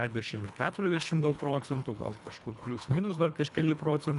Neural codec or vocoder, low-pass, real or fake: codec, 24 kHz, 1.5 kbps, HILCodec; 10.8 kHz; fake